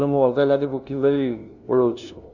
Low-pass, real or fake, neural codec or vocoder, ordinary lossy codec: 7.2 kHz; fake; codec, 16 kHz, 0.5 kbps, FunCodec, trained on LibriTTS, 25 frames a second; none